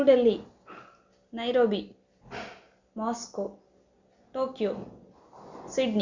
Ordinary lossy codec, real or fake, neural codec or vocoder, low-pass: Opus, 64 kbps; real; none; 7.2 kHz